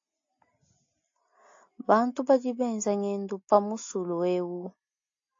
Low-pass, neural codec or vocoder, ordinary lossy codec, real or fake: 7.2 kHz; none; AAC, 48 kbps; real